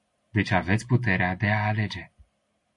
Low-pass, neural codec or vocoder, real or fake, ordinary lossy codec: 10.8 kHz; none; real; MP3, 48 kbps